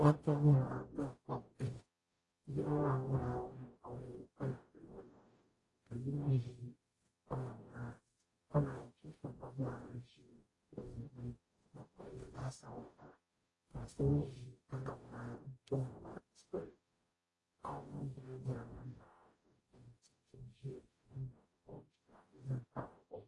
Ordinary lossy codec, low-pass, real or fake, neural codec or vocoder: AAC, 32 kbps; 10.8 kHz; fake; codec, 44.1 kHz, 0.9 kbps, DAC